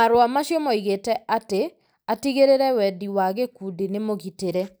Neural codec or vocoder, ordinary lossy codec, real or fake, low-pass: none; none; real; none